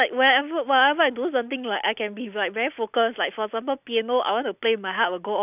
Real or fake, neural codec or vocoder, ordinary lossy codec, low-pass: real; none; none; 3.6 kHz